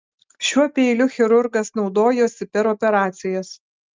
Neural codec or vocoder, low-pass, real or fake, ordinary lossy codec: none; 7.2 kHz; real; Opus, 24 kbps